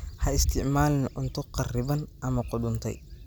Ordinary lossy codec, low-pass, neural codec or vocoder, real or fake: none; none; none; real